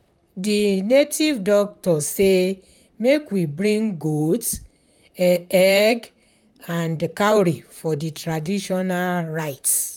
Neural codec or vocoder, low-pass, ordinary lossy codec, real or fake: vocoder, 44.1 kHz, 128 mel bands, Pupu-Vocoder; 19.8 kHz; none; fake